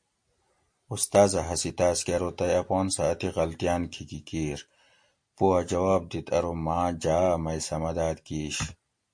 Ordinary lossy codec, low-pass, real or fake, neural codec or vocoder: MP3, 48 kbps; 9.9 kHz; real; none